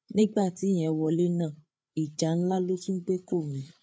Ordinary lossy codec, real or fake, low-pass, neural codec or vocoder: none; fake; none; codec, 16 kHz, 8 kbps, FreqCodec, larger model